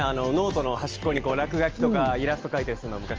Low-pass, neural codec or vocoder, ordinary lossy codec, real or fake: 7.2 kHz; none; Opus, 24 kbps; real